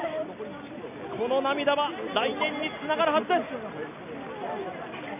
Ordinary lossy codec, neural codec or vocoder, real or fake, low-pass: none; none; real; 3.6 kHz